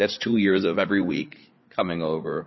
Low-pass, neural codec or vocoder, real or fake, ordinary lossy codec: 7.2 kHz; codec, 16 kHz, 8 kbps, FreqCodec, larger model; fake; MP3, 24 kbps